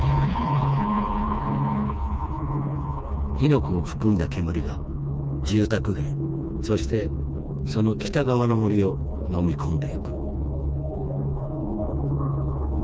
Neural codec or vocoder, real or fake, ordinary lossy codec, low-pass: codec, 16 kHz, 2 kbps, FreqCodec, smaller model; fake; none; none